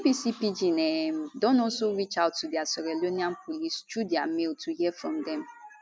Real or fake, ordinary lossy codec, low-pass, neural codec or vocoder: real; none; none; none